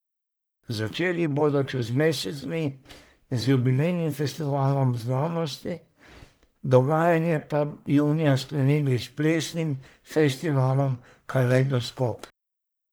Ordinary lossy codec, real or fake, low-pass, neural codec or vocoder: none; fake; none; codec, 44.1 kHz, 1.7 kbps, Pupu-Codec